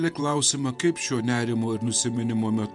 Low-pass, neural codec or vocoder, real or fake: 10.8 kHz; vocoder, 48 kHz, 128 mel bands, Vocos; fake